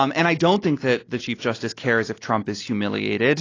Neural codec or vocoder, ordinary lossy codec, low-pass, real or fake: none; AAC, 32 kbps; 7.2 kHz; real